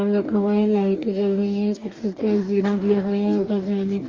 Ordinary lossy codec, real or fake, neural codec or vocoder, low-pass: Opus, 32 kbps; fake; codec, 44.1 kHz, 2.6 kbps, DAC; 7.2 kHz